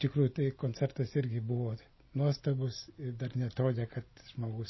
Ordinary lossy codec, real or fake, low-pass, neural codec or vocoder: MP3, 24 kbps; real; 7.2 kHz; none